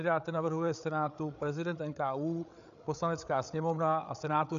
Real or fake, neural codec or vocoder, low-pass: fake; codec, 16 kHz, 8 kbps, FreqCodec, larger model; 7.2 kHz